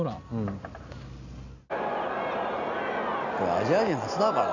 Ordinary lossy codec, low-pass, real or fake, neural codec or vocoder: none; 7.2 kHz; real; none